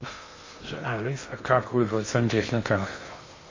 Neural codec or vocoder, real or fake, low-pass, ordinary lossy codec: codec, 16 kHz in and 24 kHz out, 0.6 kbps, FocalCodec, streaming, 2048 codes; fake; 7.2 kHz; MP3, 32 kbps